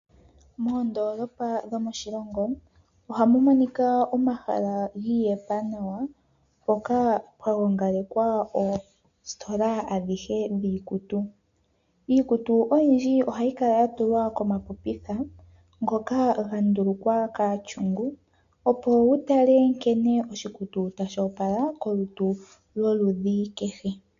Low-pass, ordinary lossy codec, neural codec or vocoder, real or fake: 7.2 kHz; MP3, 64 kbps; none; real